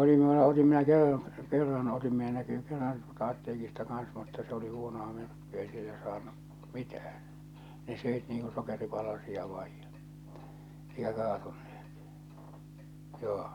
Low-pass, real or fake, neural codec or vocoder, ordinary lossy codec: 19.8 kHz; real; none; none